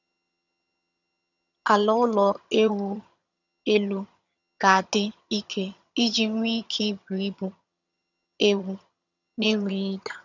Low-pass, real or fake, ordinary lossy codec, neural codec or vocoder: 7.2 kHz; fake; none; vocoder, 22.05 kHz, 80 mel bands, HiFi-GAN